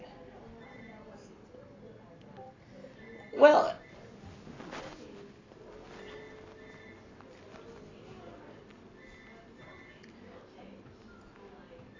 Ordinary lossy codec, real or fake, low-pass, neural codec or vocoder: AAC, 32 kbps; real; 7.2 kHz; none